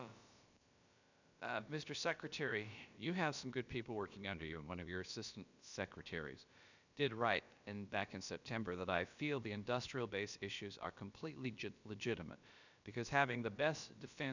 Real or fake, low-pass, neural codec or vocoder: fake; 7.2 kHz; codec, 16 kHz, about 1 kbps, DyCAST, with the encoder's durations